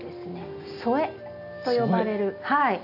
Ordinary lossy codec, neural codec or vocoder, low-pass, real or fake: none; none; 5.4 kHz; real